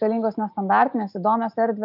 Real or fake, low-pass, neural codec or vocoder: real; 5.4 kHz; none